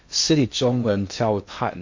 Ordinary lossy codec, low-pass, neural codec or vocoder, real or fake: MP3, 48 kbps; 7.2 kHz; codec, 16 kHz in and 24 kHz out, 0.6 kbps, FocalCodec, streaming, 2048 codes; fake